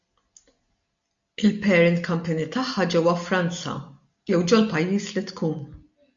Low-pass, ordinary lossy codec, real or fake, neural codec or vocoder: 7.2 kHz; MP3, 48 kbps; real; none